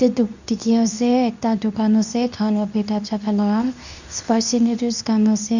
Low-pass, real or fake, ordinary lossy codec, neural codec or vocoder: 7.2 kHz; fake; none; codec, 24 kHz, 0.9 kbps, WavTokenizer, medium speech release version 2